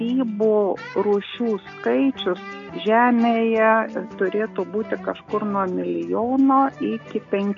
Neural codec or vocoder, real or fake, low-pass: none; real; 7.2 kHz